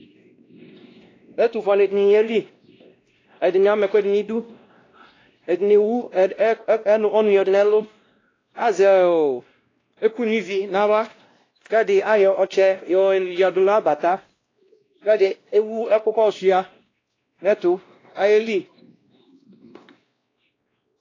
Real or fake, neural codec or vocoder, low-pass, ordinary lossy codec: fake; codec, 16 kHz, 1 kbps, X-Codec, WavLM features, trained on Multilingual LibriSpeech; 7.2 kHz; AAC, 32 kbps